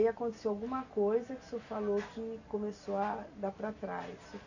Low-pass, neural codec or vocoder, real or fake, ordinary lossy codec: 7.2 kHz; none; real; none